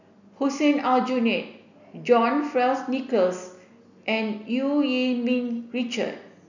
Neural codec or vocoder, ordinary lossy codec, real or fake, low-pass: none; none; real; 7.2 kHz